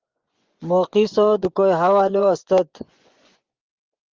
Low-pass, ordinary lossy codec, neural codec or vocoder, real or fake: 7.2 kHz; Opus, 24 kbps; vocoder, 24 kHz, 100 mel bands, Vocos; fake